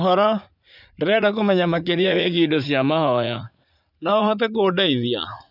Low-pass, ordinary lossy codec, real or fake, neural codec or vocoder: 5.4 kHz; none; fake; vocoder, 44.1 kHz, 80 mel bands, Vocos